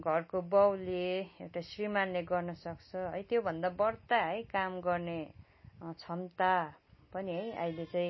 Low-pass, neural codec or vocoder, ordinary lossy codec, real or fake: 7.2 kHz; none; MP3, 24 kbps; real